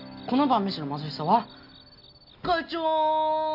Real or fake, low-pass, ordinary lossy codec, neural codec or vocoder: real; 5.4 kHz; none; none